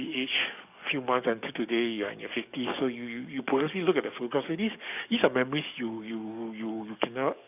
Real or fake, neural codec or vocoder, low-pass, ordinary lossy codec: fake; codec, 44.1 kHz, 7.8 kbps, DAC; 3.6 kHz; none